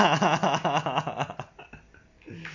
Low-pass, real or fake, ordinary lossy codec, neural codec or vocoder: 7.2 kHz; real; MP3, 48 kbps; none